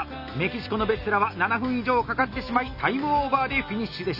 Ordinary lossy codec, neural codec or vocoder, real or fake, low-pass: none; none; real; 5.4 kHz